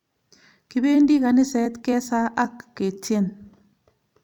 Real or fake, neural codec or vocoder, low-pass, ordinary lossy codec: fake; vocoder, 44.1 kHz, 128 mel bands every 256 samples, BigVGAN v2; 19.8 kHz; none